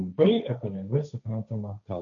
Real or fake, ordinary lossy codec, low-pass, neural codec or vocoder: fake; MP3, 96 kbps; 7.2 kHz; codec, 16 kHz, 1.1 kbps, Voila-Tokenizer